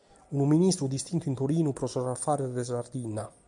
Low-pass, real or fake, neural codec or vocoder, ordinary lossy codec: 10.8 kHz; real; none; MP3, 96 kbps